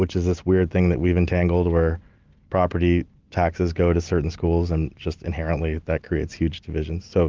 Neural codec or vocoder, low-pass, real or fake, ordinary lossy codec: none; 7.2 kHz; real; Opus, 32 kbps